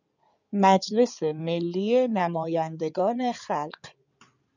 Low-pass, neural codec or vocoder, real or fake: 7.2 kHz; codec, 16 kHz in and 24 kHz out, 2.2 kbps, FireRedTTS-2 codec; fake